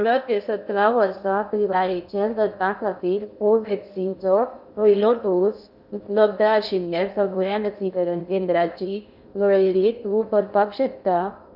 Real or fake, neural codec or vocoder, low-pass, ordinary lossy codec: fake; codec, 16 kHz in and 24 kHz out, 0.6 kbps, FocalCodec, streaming, 2048 codes; 5.4 kHz; none